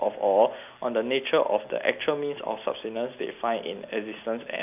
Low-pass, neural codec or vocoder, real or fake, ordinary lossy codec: 3.6 kHz; none; real; none